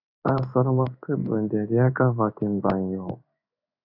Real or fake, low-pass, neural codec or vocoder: fake; 5.4 kHz; codec, 16 kHz in and 24 kHz out, 1 kbps, XY-Tokenizer